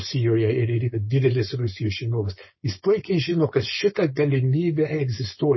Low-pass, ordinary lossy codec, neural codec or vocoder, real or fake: 7.2 kHz; MP3, 24 kbps; codec, 16 kHz, 4.8 kbps, FACodec; fake